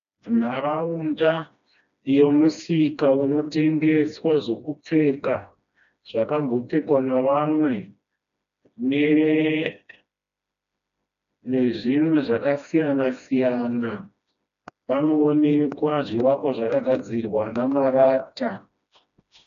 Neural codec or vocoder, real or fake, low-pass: codec, 16 kHz, 1 kbps, FreqCodec, smaller model; fake; 7.2 kHz